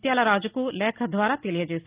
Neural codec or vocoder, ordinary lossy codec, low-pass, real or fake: none; Opus, 32 kbps; 3.6 kHz; real